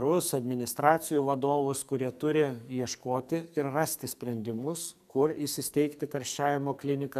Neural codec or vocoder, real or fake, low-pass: codec, 44.1 kHz, 2.6 kbps, SNAC; fake; 14.4 kHz